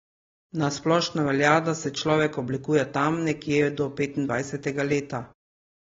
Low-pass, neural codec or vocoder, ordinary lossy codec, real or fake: 7.2 kHz; none; AAC, 24 kbps; real